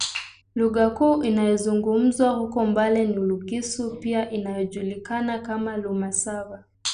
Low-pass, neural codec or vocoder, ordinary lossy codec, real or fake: 9.9 kHz; none; none; real